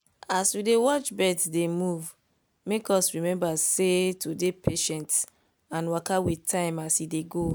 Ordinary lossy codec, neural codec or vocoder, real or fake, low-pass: none; none; real; none